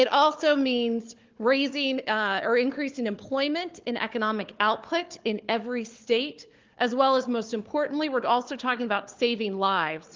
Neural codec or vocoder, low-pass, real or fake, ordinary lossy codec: codec, 16 kHz, 4 kbps, FunCodec, trained on Chinese and English, 50 frames a second; 7.2 kHz; fake; Opus, 32 kbps